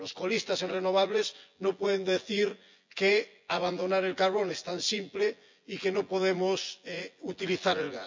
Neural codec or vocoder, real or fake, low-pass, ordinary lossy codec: vocoder, 24 kHz, 100 mel bands, Vocos; fake; 7.2 kHz; MP3, 64 kbps